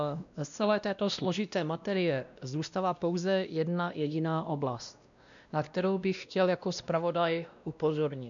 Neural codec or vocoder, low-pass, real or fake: codec, 16 kHz, 1 kbps, X-Codec, WavLM features, trained on Multilingual LibriSpeech; 7.2 kHz; fake